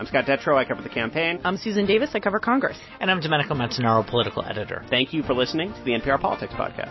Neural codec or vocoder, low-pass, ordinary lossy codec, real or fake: none; 7.2 kHz; MP3, 24 kbps; real